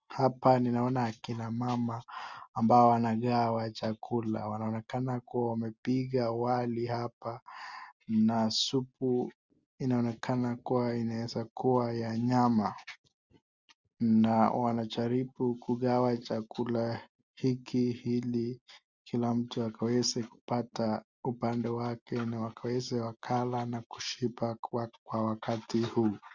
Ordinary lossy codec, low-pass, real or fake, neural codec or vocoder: Opus, 64 kbps; 7.2 kHz; real; none